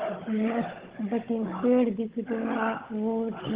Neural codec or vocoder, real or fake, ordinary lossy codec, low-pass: codec, 16 kHz, 16 kbps, FunCodec, trained on Chinese and English, 50 frames a second; fake; Opus, 16 kbps; 3.6 kHz